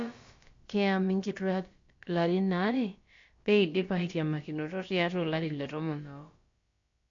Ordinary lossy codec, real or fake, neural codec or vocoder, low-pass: MP3, 48 kbps; fake; codec, 16 kHz, about 1 kbps, DyCAST, with the encoder's durations; 7.2 kHz